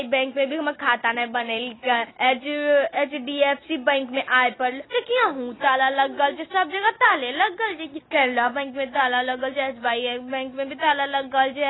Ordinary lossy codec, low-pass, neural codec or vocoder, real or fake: AAC, 16 kbps; 7.2 kHz; none; real